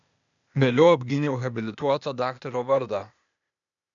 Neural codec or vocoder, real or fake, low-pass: codec, 16 kHz, 0.8 kbps, ZipCodec; fake; 7.2 kHz